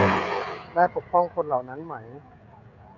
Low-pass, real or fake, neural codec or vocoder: 7.2 kHz; fake; codec, 16 kHz, 16 kbps, FreqCodec, smaller model